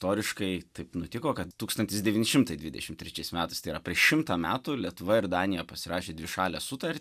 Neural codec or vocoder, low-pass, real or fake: none; 14.4 kHz; real